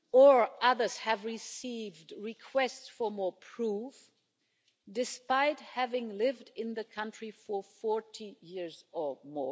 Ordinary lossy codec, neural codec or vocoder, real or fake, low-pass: none; none; real; none